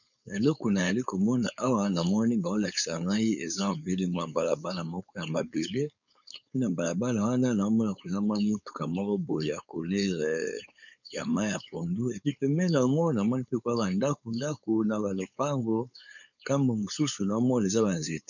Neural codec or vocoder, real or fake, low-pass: codec, 16 kHz, 4.8 kbps, FACodec; fake; 7.2 kHz